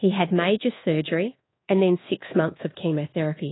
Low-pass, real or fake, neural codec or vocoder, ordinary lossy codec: 7.2 kHz; fake; codec, 24 kHz, 1.2 kbps, DualCodec; AAC, 16 kbps